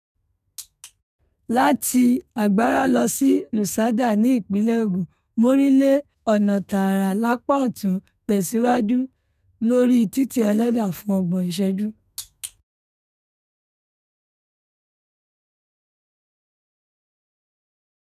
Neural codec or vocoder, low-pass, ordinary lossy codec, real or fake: codec, 32 kHz, 1.9 kbps, SNAC; 14.4 kHz; none; fake